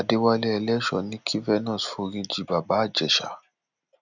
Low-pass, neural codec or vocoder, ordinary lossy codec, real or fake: 7.2 kHz; none; none; real